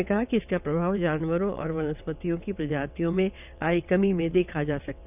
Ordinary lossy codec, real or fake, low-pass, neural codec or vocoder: none; fake; 3.6 kHz; vocoder, 22.05 kHz, 80 mel bands, WaveNeXt